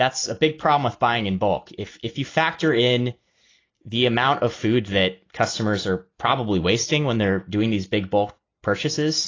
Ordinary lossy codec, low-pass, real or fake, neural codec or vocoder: AAC, 32 kbps; 7.2 kHz; real; none